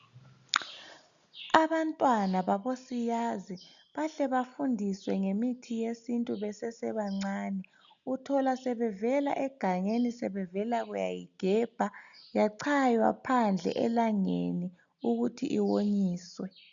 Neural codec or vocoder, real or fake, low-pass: none; real; 7.2 kHz